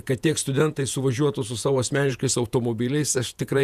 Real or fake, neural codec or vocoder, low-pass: fake; vocoder, 48 kHz, 128 mel bands, Vocos; 14.4 kHz